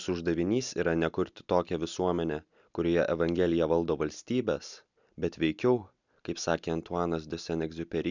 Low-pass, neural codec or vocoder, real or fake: 7.2 kHz; none; real